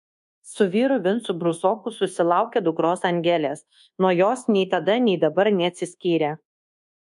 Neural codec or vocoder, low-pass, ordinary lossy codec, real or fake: codec, 24 kHz, 1.2 kbps, DualCodec; 10.8 kHz; MP3, 64 kbps; fake